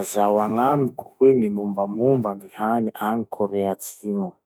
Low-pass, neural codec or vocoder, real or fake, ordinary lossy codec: 19.8 kHz; autoencoder, 48 kHz, 32 numbers a frame, DAC-VAE, trained on Japanese speech; fake; none